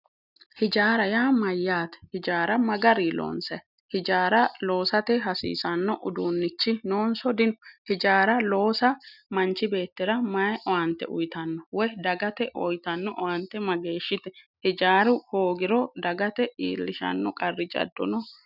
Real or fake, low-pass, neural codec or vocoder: real; 5.4 kHz; none